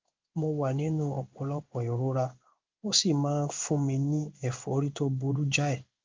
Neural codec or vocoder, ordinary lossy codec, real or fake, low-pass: codec, 16 kHz in and 24 kHz out, 1 kbps, XY-Tokenizer; Opus, 24 kbps; fake; 7.2 kHz